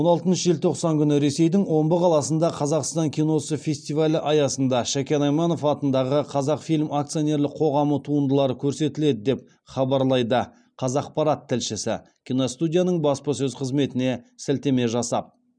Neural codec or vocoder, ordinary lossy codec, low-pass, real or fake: none; none; 9.9 kHz; real